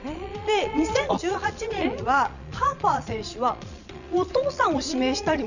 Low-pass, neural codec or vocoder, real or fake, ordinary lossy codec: 7.2 kHz; vocoder, 22.05 kHz, 80 mel bands, Vocos; fake; none